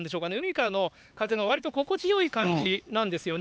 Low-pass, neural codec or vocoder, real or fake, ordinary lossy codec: none; codec, 16 kHz, 4 kbps, X-Codec, HuBERT features, trained on LibriSpeech; fake; none